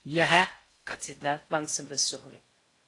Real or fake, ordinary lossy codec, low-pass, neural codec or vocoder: fake; AAC, 48 kbps; 10.8 kHz; codec, 16 kHz in and 24 kHz out, 0.6 kbps, FocalCodec, streaming, 4096 codes